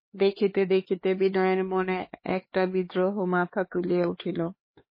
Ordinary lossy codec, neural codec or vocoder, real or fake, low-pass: MP3, 24 kbps; codec, 16 kHz, 4 kbps, X-Codec, HuBERT features, trained on LibriSpeech; fake; 5.4 kHz